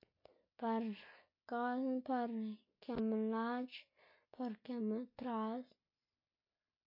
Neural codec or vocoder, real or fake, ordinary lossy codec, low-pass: none; real; MP3, 32 kbps; 5.4 kHz